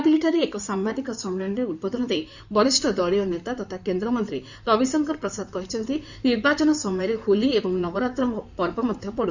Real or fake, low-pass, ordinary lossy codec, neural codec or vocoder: fake; 7.2 kHz; none; codec, 16 kHz in and 24 kHz out, 2.2 kbps, FireRedTTS-2 codec